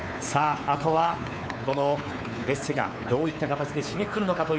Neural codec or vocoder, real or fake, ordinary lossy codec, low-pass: codec, 16 kHz, 4 kbps, X-Codec, WavLM features, trained on Multilingual LibriSpeech; fake; none; none